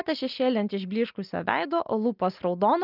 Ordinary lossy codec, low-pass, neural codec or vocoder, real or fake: Opus, 24 kbps; 5.4 kHz; none; real